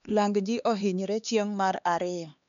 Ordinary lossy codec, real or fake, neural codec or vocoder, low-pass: none; fake; codec, 16 kHz, 2 kbps, X-Codec, HuBERT features, trained on LibriSpeech; 7.2 kHz